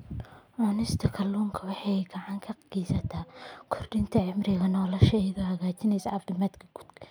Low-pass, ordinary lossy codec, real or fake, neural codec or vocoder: none; none; real; none